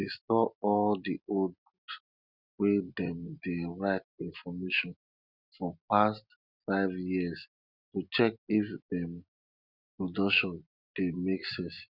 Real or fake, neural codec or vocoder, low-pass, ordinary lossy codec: real; none; 5.4 kHz; Opus, 64 kbps